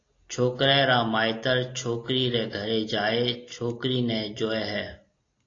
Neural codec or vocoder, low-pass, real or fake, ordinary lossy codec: none; 7.2 kHz; real; AAC, 32 kbps